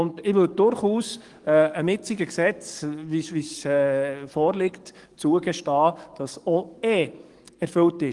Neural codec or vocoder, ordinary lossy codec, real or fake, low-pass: codec, 44.1 kHz, 7.8 kbps, DAC; Opus, 24 kbps; fake; 10.8 kHz